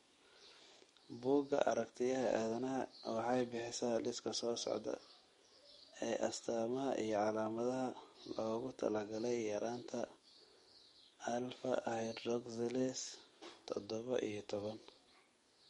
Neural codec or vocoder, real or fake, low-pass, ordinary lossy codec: codec, 44.1 kHz, 7.8 kbps, DAC; fake; 19.8 kHz; MP3, 48 kbps